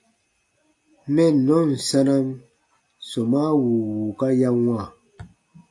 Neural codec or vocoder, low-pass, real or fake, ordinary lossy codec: none; 10.8 kHz; real; AAC, 64 kbps